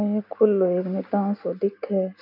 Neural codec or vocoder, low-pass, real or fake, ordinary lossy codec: none; 5.4 kHz; real; MP3, 48 kbps